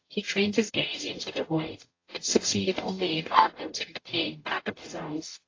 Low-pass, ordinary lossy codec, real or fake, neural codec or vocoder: 7.2 kHz; AAC, 32 kbps; fake; codec, 44.1 kHz, 0.9 kbps, DAC